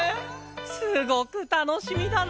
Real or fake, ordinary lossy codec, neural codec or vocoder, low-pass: real; none; none; none